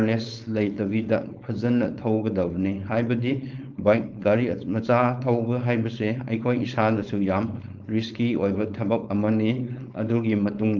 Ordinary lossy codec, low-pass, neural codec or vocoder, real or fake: Opus, 32 kbps; 7.2 kHz; codec, 16 kHz, 4.8 kbps, FACodec; fake